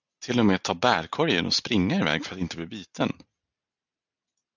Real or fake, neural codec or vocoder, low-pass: real; none; 7.2 kHz